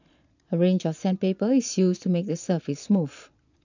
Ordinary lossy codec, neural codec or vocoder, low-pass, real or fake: none; vocoder, 22.05 kHz, 80 mel bands, Vocos; 7.2 kHz; fake